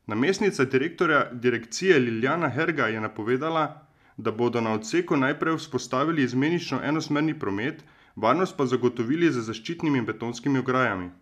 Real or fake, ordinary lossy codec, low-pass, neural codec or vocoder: real; MP3, 96 kbps; 14.4 kHz; none